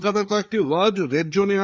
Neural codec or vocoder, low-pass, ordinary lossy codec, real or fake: codec, 16 kHz, 4 kbps, FreqCodec, larger model; none; none; fake